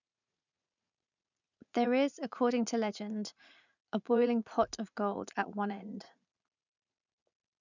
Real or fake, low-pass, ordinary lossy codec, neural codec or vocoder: fake; 7.2 kHz; none; vocoder, 44.1 kHz, 80 mel bands, Vocos